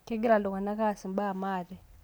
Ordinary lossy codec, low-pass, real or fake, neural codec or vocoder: none; none; real; none